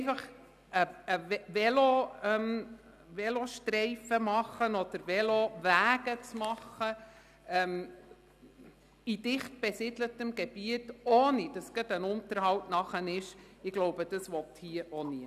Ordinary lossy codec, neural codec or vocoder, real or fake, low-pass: none; none; real; 14.4 kHz